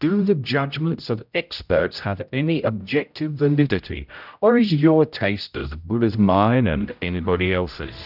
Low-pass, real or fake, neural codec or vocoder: 5.4 kHz; fake; codec, 16 kHz, 0.5 kbps, X-Codec, HuBERT features, trained on general audio